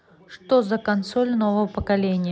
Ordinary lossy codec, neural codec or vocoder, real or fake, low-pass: none; none; real; none